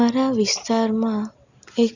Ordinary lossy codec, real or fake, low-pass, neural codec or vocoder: Opus, 64 kbps; real; 7.2 kHz; none